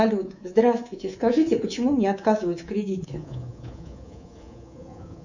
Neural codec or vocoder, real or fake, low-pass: codec, 24 kHz, 3.1 kbps, DualCodec; fake; 7.2 kHz